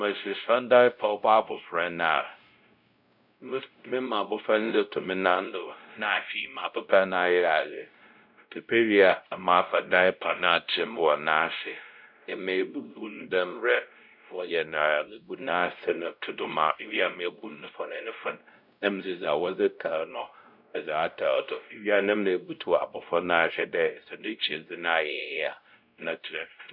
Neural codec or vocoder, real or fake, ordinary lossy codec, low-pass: codec, 16 kHz, 0.5 kbps, X-Codec, WavLM features, trained on Multilingual LibriSpeech; fake; none; 5.4 kHz